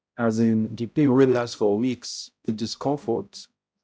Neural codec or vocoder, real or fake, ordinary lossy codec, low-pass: codec, 16 kHz, 0.5 kbps, X-Codec, HuBERT features, trained on balanced general audio; fake; none; none